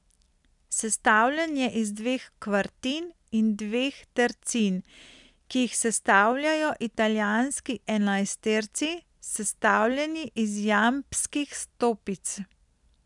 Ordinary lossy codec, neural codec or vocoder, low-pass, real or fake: none; none; 10.8 kHz; real